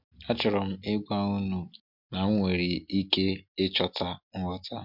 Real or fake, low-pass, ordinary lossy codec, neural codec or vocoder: real; 5.4 kHz; MP3, 48 kbps; none